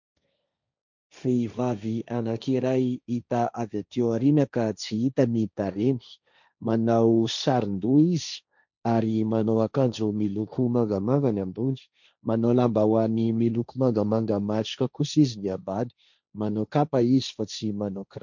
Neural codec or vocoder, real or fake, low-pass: codec, 16 kHz, 1.1 kbps, Voila-Tokenizer; fake; 7.2 kHz